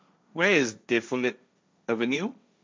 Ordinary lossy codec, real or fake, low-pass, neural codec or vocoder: none; fake; none; codec, 16 kHz, 1.1 kbps, Voila-Tokenizer